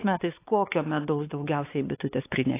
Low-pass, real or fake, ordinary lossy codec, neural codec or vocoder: 3.6 kHz; fake; AAC, 24 kbps; codec, 16 kHz, 4 kbps, X-Codec, WavLM features, trained on Multilingual LibriSpeech